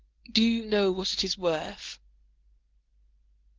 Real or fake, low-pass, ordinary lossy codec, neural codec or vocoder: fake; 7.2 kHz; Opus, 16 kbps; codec, 24 kHz, 0.9 kbps, WavTokenizer, medium speech release version 1